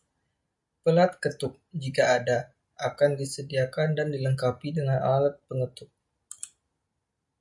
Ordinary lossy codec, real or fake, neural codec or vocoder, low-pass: MP3, 64 kbps; real; none; 10.8 kHz